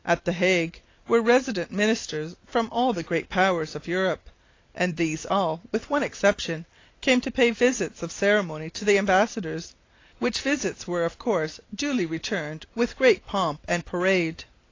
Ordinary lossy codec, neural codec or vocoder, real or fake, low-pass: AAC, 32 kbps; none; real; 7.2 kHz